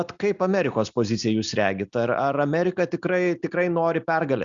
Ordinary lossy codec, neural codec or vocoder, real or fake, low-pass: Opus, 64 kbps; none; real; 7.2 kHz